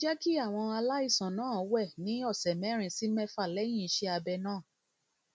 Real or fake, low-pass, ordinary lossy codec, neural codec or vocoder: real; none; none; none